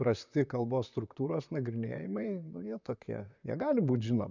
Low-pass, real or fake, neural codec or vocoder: 7.2 kHz; fake; vocoder, 44.1 kHz, 128 mel bands, Pupu-Vocoder